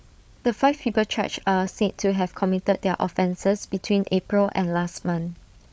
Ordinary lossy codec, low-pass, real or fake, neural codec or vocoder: none; none; fake; codec, 16 kHz, 8 kbps, FreqCodec, larger model